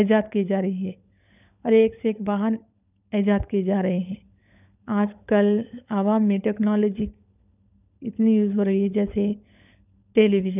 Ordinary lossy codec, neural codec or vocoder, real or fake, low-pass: none; codec, 16 kHz, 16 kbps, FunCodec, trained on LibriTTS, 50 frames a second; fake; 3.6 kHz